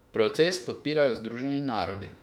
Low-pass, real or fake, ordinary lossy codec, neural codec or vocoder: 19.8 kHz; fake; none; autoencoder, 48 kHz, 32 numbers a frame, DAC-VAE, trained on Japanese speech